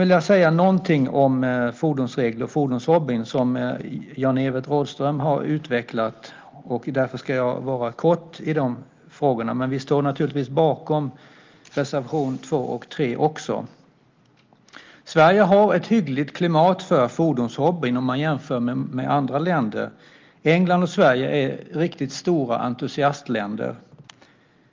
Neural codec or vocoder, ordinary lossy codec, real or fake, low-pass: none; Opus, 32 kbps; real; 7.2 kHz